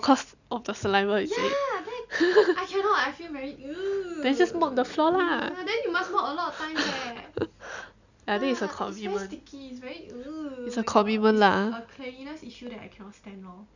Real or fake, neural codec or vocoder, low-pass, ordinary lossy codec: real; none; 7.2 kHz; none